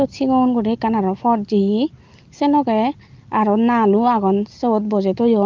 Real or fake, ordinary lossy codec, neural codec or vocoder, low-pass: real; Opus, 32 kbps; none; 7.2 kHz